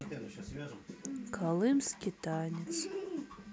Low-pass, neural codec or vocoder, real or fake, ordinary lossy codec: none; none; real; none